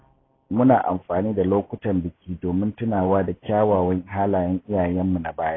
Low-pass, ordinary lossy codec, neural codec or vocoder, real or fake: 7.2 kHz; AAC, 16 kbps; none; real